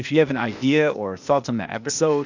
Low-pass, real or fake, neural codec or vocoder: 7.2 kHz; fake; codec, 16 kHz, 0.8 kbps, ZipCodec